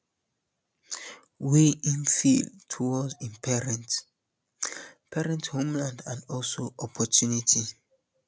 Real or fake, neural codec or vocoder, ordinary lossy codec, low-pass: real; none; none; none